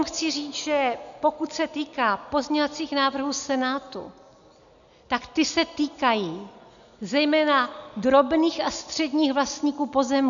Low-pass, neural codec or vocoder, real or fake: 7.2 kHz; none; real